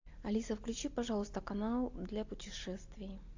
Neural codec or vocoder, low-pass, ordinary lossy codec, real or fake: none; 7.2 kHz; AAC, 48 kbps; real